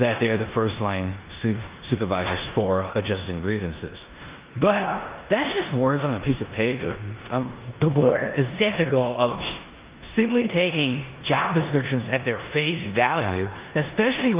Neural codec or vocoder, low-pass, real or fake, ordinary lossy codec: codec, 16 kHz in and 24 kHz out, 0.9 kbps, LongCat-Audio-Codec, four codebook decoder; 3.6 kHz; fake; Opus, 24 kbps